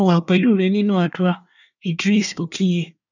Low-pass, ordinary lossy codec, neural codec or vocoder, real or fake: 7.2 kHz; none; codec, 24 kHz, 1 kbps, SNAC; fake